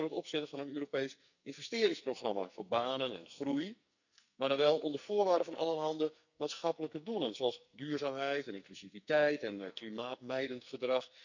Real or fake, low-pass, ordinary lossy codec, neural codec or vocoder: fake; 7.2 kHz; none; codec, 44.1 kHz, 2.6 kbps, SNAC